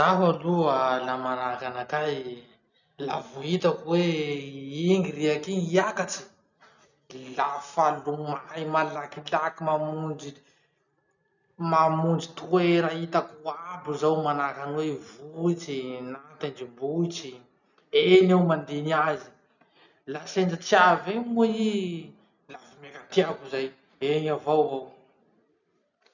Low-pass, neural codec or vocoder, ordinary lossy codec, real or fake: 7.2 kHz; none; none; real